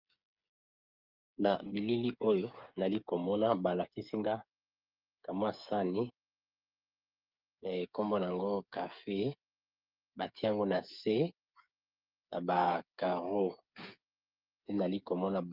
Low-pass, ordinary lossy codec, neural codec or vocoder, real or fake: 5.4 kHz; Opus, 24 kbps; codec, 16 kHz, 8 kbps, FreqCodec, smaller model; fake